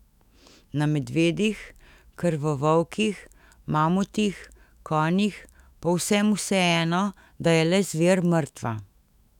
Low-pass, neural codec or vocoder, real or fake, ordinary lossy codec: 19.8 kHz; autoencoder, 48 kHz, 128 numbers a frame, DAC-VAE, trained on Japanese speech; fake; none